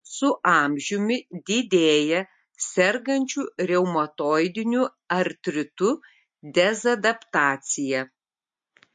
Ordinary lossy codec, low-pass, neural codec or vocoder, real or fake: MP3, 48 kbps; 10.8 kHz; none; real